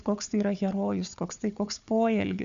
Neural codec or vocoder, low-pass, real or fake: codec, 16 kHz, 4 kbps, FunCodec, trained on Chinese and English, 50 frames a second; 7.2 kHz; fake